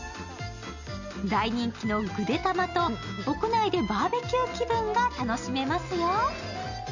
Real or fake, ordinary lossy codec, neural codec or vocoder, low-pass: real; none; none; 7.2 kHz